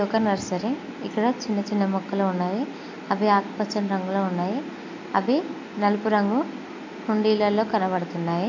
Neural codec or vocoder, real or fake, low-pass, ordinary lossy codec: none; real; 7.2 kHz; AAC, 48 kbps